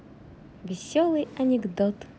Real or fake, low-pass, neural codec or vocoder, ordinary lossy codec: real; none; none; none